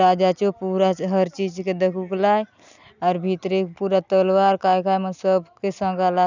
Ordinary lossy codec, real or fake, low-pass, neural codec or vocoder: none; real; 7.2 kHz; none